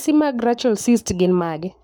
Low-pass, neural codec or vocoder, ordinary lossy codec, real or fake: none; codec, 44.1 kHz, 7.8 kbps, Pupu-Codec; none; fake